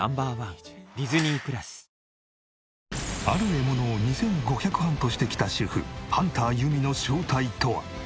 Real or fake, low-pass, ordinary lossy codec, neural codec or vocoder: real; none; none; none